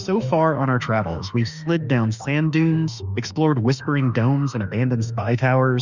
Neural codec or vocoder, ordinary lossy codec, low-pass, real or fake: autoencoder, 48 kHz, 32 numbers a frame, DAC-VAE, trained on Japanese speech; Opus, 64 kbps; 7.2 kHz; fake